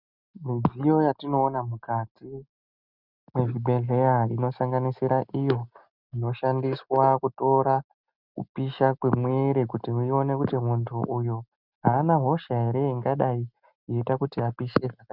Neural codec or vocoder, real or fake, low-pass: none; real; 5.4 kHz